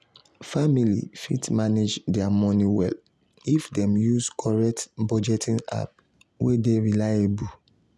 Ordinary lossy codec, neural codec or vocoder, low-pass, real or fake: none; none; none; real